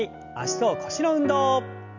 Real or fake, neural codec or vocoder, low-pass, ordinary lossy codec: real; none; 7.2 kHz; none